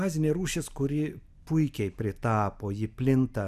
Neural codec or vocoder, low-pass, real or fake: none; 14.4 kHz; real